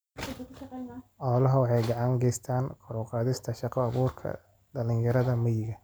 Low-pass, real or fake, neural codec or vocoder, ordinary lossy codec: none; real; none; none